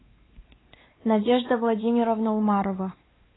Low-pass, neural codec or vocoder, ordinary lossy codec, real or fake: 7.2 kHz; codec, 16 kHz, 2 kbps, X-Codec, WavLM features, trained on Multilingual LibriSpeech; AAC, 16 kbps; fake